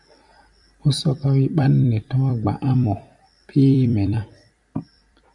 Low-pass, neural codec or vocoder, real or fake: 10.8 kHz; vocoder, 44.1 kHz, 128 mel bands every 256 samples, BigVGAN v2; fake